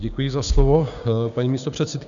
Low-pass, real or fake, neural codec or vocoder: 7.2 kHz; fake; codec, 16 kHz, 6 kbps, DAC